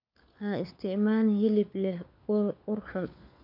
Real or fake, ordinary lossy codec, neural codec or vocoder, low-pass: fake; none; codec, 16 kHz, 4 kbps, FunCodec, trained on LibriTTS, 50 frames a second; 5.4 kHz